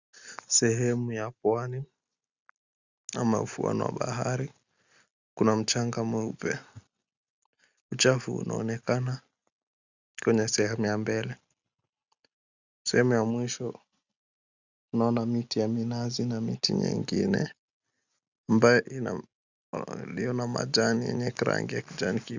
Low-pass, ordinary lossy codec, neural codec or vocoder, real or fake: 7.2 kHz; Opus, 64 kbps; none; real